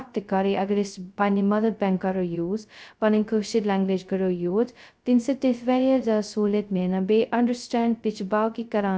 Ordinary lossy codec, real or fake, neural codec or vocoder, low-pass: none; fake; codec, 16 kHz, 0.2 kbps, FocalCodec; none